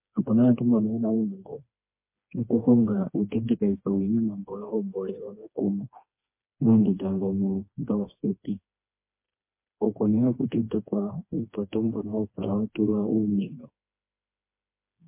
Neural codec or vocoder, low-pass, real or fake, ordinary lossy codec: codec, 16 kHz, 2 kbps, FreqCodec, smaller model; 3.6 kHz; fake; MP3, 24 kbps